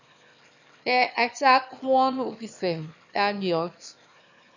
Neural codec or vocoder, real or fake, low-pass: autoencoder, 22.05 kHz, a latent of 192 numbers a frame, VITS, trained on one speaker; fake; 7.2 kHz